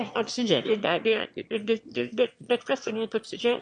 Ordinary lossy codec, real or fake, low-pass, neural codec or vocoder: MP3, 48 kbps; fake; 9.9 kHz; autoencoder, 22.05 kHz, a latent of 192 numbers a frame, VITS, trained on one speaker